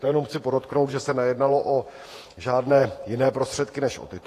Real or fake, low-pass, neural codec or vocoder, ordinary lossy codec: fake; 14.4 kHz; vocoder, 48 kHz, 128 mel bands, Vocos; AAC, 48 kbps